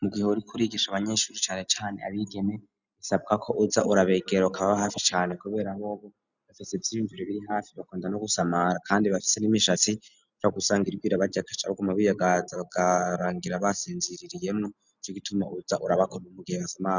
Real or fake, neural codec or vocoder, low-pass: real; none; 7.2 kHz